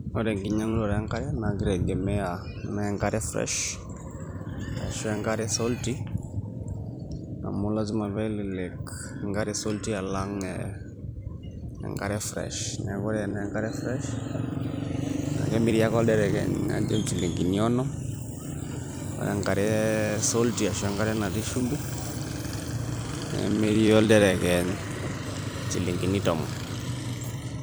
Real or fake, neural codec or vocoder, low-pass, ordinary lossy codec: fake; vocoder, 44.1 kHz, 128 mel bands every 256 samples, BigVGAN v2; none; none